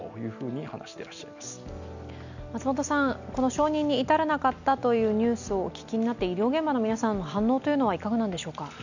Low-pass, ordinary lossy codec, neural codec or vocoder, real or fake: 7.2 kHz; none; none; real